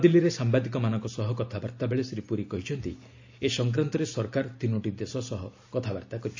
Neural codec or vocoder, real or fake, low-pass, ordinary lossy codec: none; real; 7.2 kHz; AAC, 48 kbps